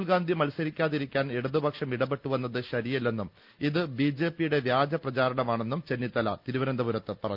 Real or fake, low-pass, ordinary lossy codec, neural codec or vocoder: real; 5.4 kHz; Opus, 24 kbps; none